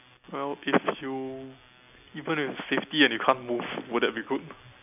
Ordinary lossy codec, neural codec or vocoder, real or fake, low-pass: none; none; real; 3.6 kHz